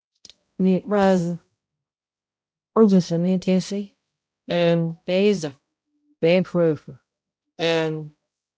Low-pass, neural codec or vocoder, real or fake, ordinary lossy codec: none; codec, 16 kHz, 0.5 kbps, X-Codec, HuBERT features, trained on balanced general audio; fake; none